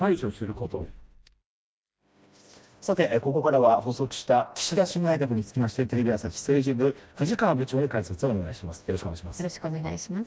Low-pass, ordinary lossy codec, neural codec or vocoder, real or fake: none; none; codec, 16 kHz, 1 kbps, FreqCodec, smaller model; fake